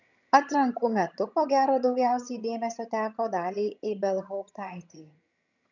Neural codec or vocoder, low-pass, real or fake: vocoder, 22.05 kHz, 80 mel bands, HiFi-GAN; 7.2 kHz; fake